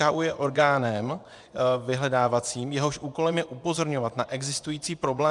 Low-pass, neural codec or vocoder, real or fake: 10.8 kHz; vocoder, 24 kHz, 100 mel bands, Vocos; fake